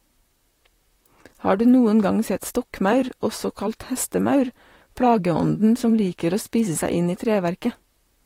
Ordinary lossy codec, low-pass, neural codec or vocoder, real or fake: AAC, 48 kbps; 19.8 kHz; vocoder, 44.1 kHz, 128 mel bands, Pupu-Vocoder; fake